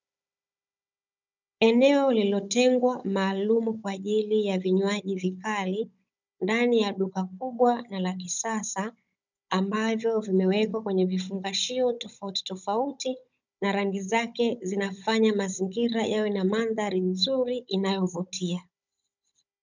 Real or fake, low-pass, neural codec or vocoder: fake; 7.2 kHz; codec, 16 kHz, 16 kbps, FunCodec, trained on Chinese and English, 50 frames a second